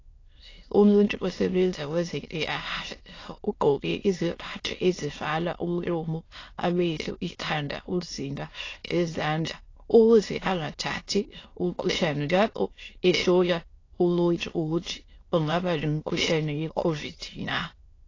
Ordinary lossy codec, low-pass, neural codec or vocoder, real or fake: AAC, 32 kbps; 7.2 kHz; autoencoder, 22.05 kHz, a latent of 192 numbers a frame, VITS, trained on many speakers; fake